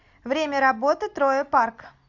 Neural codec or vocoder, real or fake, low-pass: none; real; 7.2 kHz